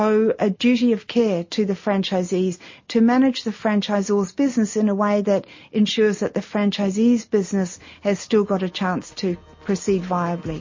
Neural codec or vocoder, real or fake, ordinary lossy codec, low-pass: none; real; MP3, 32 kbps; 7.2 kHz